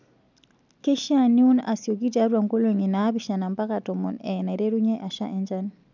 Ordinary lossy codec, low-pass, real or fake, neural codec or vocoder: none; 7.2 kHz; real; none